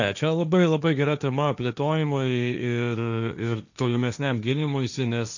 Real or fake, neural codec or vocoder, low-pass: fake; codec, 16 kHz, 1.1 kbps, Voila-Tokenizer; 7.2 kHz